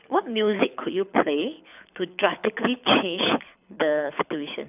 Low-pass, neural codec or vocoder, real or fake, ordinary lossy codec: 3.6 kHz; codec, 24 kHz, 6 kbps, HILCodec; fake; none